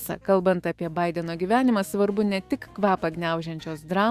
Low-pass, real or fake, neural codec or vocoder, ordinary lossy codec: 14.4 kHz; fake; autoencoder, 48 kHz, 128 numbers a frame, DAC-VAE, trained on Japanese speech; Opus, 64 kbps